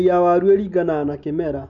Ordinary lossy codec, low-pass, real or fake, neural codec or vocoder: none; 7.2 kHz; real; none